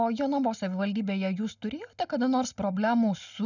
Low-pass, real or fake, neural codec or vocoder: 7.2 kHz; real; none